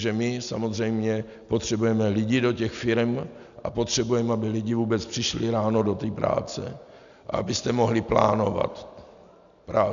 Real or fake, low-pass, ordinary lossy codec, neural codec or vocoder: real; 7.2 kHz; MP3, 96 kbps; none